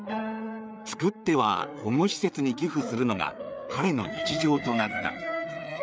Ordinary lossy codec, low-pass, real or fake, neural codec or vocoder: none; none; fake; codec, 16 kHz, 4 kbps, FreqCodec, larger model